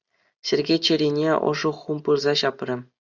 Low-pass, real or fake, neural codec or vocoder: 7.2 kHz; real; none